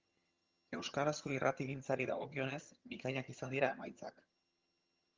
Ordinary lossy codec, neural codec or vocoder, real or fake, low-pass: Opus, 32 kbps; vocoder, 22.05 kHz, 80 mel bands, HiFi-GAN; fake; 7.2 kHz